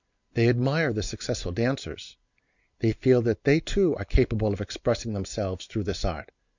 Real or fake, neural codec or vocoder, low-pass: real; none; 7.2 kHz